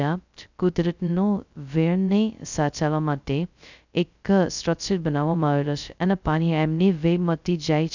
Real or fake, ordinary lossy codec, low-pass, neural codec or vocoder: fake; none; 7.2 kHz; codec, 16 kHz, 0.2 kbps, FocalCodec